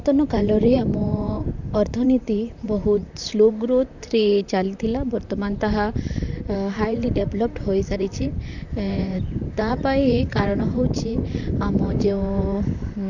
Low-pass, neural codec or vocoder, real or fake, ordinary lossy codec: 7.2 kHz; vocoder, 44.1 kHz, 128 mel bands, Pupu-Vocoder; fake; none